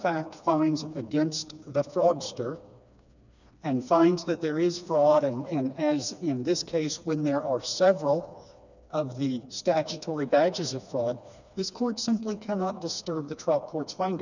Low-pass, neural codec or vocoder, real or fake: 7.2 kHz; codec, 16 kHz, 2 kbps, FreqCodec, smaller model; fake